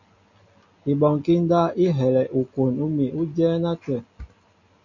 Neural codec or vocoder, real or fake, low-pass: none; real; 7.2 kHz